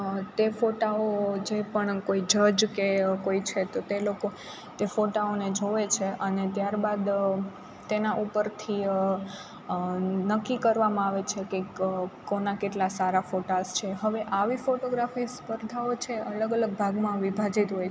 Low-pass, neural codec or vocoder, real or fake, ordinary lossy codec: none; none; real; none